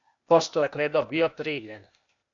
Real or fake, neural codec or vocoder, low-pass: fake; codec, 16 kHz, 0.8 kbps, ZipCodec; 7.2 kHz